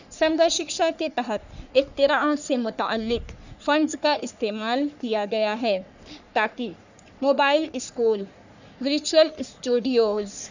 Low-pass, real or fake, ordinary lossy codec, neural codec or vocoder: 7.2 kHz; fake; none; codec, 44.1 kHz, 3.4 kbps, Pupu-Codec